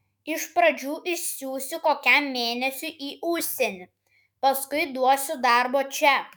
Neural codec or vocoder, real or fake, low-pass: autoencoder, 48 kHz, 128 numbers a frame, DAC-VAE, trained on Japanese speech; fake; 19.8 kHz